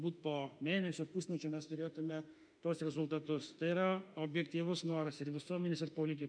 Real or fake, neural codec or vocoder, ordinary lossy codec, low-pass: fake; autoencoder, 48 kHz, 32 numbers a frame, DAC-VAE, trained on Japanese speech; MP3, 96 kbps; 9.9 kHz